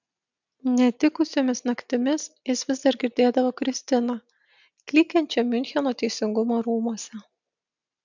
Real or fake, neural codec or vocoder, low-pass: real; none; 7.2 kHz